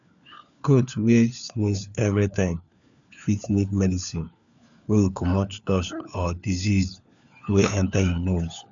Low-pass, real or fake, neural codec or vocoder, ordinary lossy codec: 7.2 kHz; fake; codec, 16 kHz, 4 kbps, FunCodec, trained on LibriTTS, 50 frames a second; none